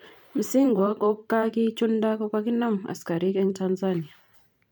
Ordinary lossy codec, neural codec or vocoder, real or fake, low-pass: none; vocoder, 44.1 kHz, 128 mel bands every 512 samples, BigVGAN v2; fake; 19.8 kHz